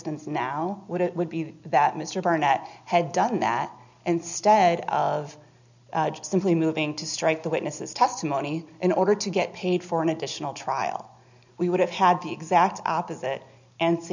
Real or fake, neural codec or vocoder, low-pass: real; none; 7.2 kHz